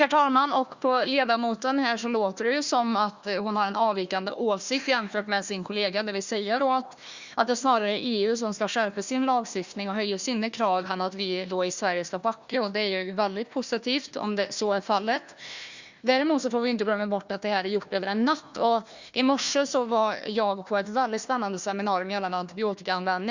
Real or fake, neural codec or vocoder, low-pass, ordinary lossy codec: fake; codec, 16 kHz, 1 kbps, FunCodec, trained on Chinese and English, 50 frames a second; 7.2 kHz; Opus, 64 kbps